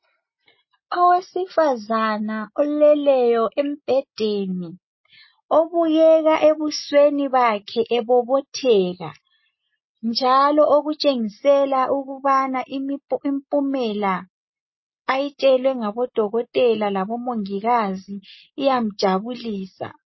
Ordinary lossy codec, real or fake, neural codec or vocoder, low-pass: MP3, 24 kbps; real; none; 7.2 kHz